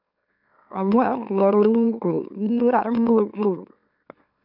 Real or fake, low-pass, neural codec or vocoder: fake; 5.4 kHz; autoencoder, 44.1 kHz, a latent of 192 numbers a frame, MeloTTS